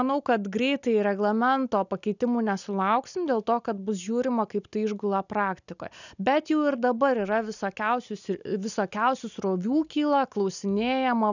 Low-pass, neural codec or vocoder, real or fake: 7.2 kHz; none; real